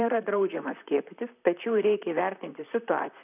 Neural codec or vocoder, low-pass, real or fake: vocoder, 44.1 kHz, 128 mel bands, Pupu-Vocoder; 3.6 kHz; fake